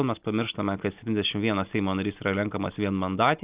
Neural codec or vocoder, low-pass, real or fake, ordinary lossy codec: none; 3.6 kHz; real; Opus, 32 kbps